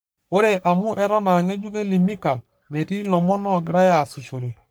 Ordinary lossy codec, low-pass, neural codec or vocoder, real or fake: none; none; codec, 44.1 kHz, 3.4 kbps, Pupu-Codec; fake